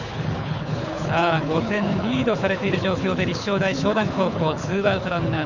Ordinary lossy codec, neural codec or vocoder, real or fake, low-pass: none; codec, 24 kHz, 6 kbps, HILCodec; fake; 7.2 kHz